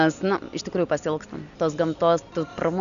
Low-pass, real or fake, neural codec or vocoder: 7.2 kHz; real; none